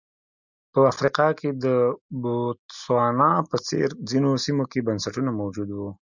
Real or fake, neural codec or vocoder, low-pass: real; none; 7.2 kHz